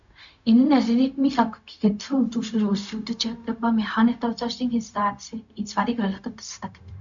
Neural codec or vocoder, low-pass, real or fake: codec, 16 kHz, 0.4 kbps, LongCat-Audio-Codec; 7.2 kHz; fake